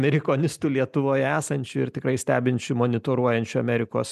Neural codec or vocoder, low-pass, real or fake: none; 14.4 kHz; real